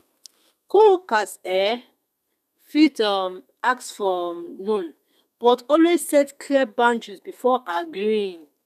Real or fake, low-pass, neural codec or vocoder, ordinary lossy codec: fake; 14.4 kHz; codec, 32 kHz, 1.9 kbps, SNAC; none